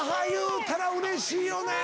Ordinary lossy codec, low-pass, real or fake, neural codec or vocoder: none; none; real; none